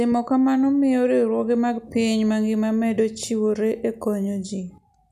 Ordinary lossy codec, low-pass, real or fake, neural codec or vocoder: none; 14.4 kHz; real; none